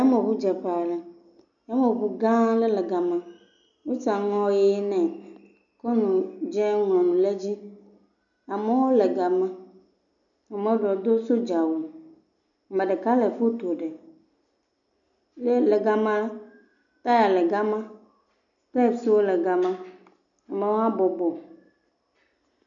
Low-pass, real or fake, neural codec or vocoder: 7.2 kHz; real; none